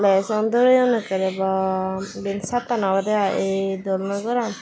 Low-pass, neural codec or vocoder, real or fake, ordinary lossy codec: none; none; real; none